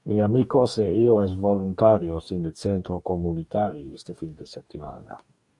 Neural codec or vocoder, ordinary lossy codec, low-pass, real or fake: codec, 44.1 kHz, 2.6 kbps, DAC; AAC, 64 kbps; 10.8 kHz; fake